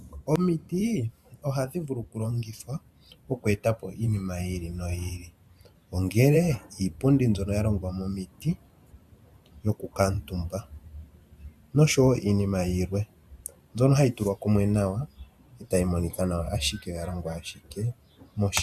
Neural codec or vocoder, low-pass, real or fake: vocoder, 44.1 kHz, 128 mel bands every 512 samples, BigVGAN v2; 14.4 kHz; fake